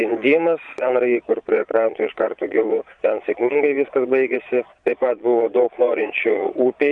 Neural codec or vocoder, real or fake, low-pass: vocoder, 22.05 kHz, 80 mel bands, Vocos; fake; 9.9 kHz